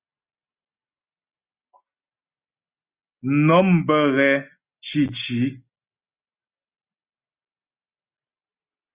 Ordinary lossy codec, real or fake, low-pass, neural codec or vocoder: Opus, 64 kbps; real; 3.6 kHz; none